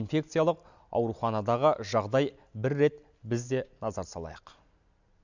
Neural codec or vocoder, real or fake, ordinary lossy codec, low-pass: none; real; none; 7.2 kHz